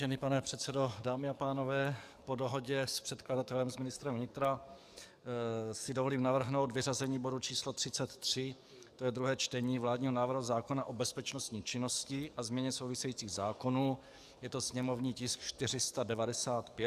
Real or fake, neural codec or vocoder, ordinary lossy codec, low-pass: fake; codec, 44.1 kHz, 7.8 kbps, DAC; Opus, 64 kbps; 14.4 kHz